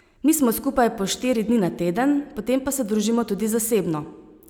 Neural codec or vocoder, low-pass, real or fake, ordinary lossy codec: none; none; real; none